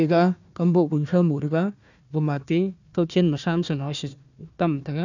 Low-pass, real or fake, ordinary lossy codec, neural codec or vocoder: 7.2 kHz; fake; none; codec, 16 kHz, 1 kbps, FunCodec, trained on Chinese and English, 50 frames a second